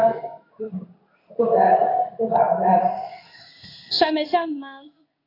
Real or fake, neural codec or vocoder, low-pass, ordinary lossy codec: fake; codec, 16 kHz in and 24 kHz out, 1 kbps, XY-Tokenizer; 5.4 kHz; AAC, 32 kbps